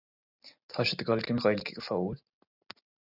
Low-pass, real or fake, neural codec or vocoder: 5.4 kHz; real; none